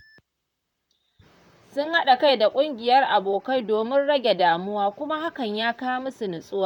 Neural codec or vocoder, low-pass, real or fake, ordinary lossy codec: vocoder, 44.1 kHz, 128 mel bands, Pupu-Vocoder; 19.8 kHz; fake; none